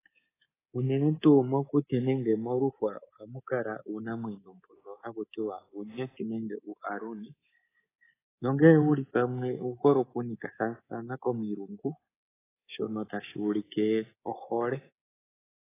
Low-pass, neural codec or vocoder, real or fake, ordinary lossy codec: 3.6 kHz; codec, 24 kHz, 3.1 kbps, DualCodec; fake; AAC, 16 kbps